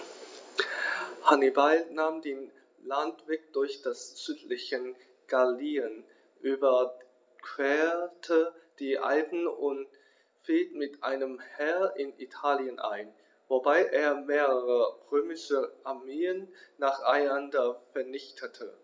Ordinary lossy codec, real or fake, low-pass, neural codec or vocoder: none; real; none; none